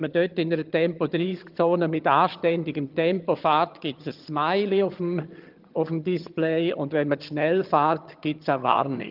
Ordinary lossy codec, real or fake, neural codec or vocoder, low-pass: Opus, 24 kbps; fake; vocoder, 22.05 kHz, 80 mel bands, HiFi-GAN; 5.4 kHz